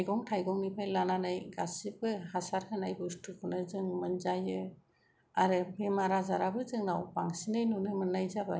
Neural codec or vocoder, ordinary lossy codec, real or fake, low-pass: none; none; real; none